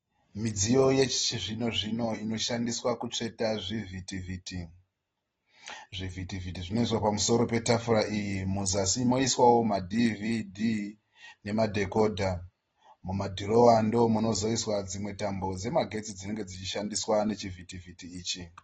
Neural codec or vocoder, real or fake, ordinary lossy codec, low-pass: none; real; AAC, 24 kbps; 19.8 kHz